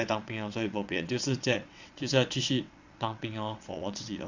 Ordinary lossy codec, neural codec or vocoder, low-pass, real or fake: Opus, 64 kbps; vocoder, 22.05 kHz, 80 mel bands, WaveNeXt; 7.2 kHz; fake